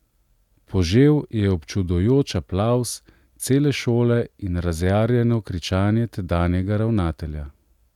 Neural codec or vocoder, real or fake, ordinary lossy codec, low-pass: none; real; none; 19.8 kHz